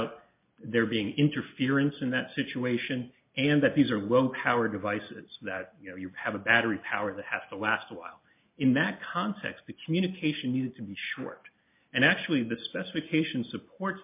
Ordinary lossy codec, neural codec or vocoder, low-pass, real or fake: MP3, 32 kbps; none; 3.6 kHz; real